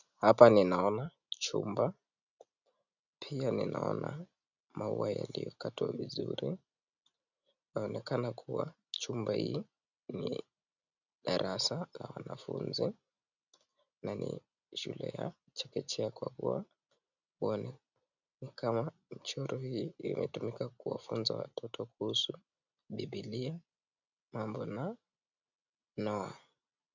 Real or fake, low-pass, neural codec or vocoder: real; 7.2 kHz; none